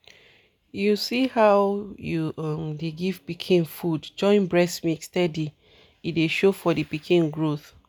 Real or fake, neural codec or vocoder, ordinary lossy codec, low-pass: real; none; none; none